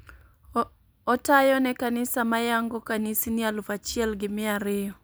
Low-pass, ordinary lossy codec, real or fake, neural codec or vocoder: none; none; fake; vocoder, 44.1 kHz, 128 mel bands every 256 samples, BigVGAN v2